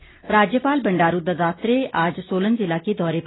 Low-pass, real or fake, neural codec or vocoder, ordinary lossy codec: 7.2 kHz; real; none; AAC, 16 kbps